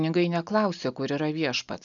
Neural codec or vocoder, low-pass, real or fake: none; 7.2 kHz; real